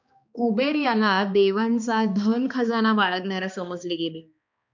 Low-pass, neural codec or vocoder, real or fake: 7.2 kHz; codec, 16 kHz, 2 kbps, X-Codec, HuBERT features, trained on balanced general audio; fake